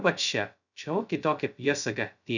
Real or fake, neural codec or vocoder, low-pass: fake; codec, 16 kHz, 0.2 kbps, FocalCodec; 7.2 kHz